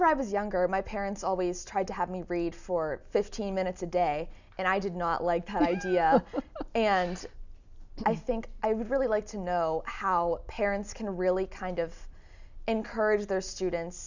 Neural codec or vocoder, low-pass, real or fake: none; 7.2 kHz; real